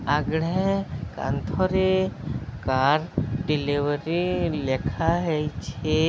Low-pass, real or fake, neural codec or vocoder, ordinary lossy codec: none; real; none; none